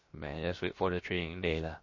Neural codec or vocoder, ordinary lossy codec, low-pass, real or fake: codec, 16 kHz, about 1 kbps, DyCAST, with the encoder's durations; MP3, 32 kbps; 7.2 kHz; fake